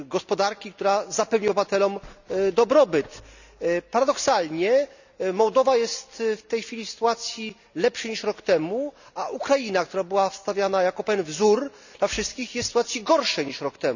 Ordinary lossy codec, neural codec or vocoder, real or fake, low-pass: none; none; real; 7.2 kHz